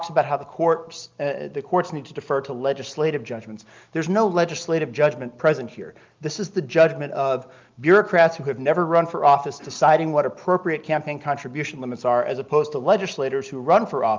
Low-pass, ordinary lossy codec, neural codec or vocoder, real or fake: 7.2 kHz; Opus, 24 kbps; none; real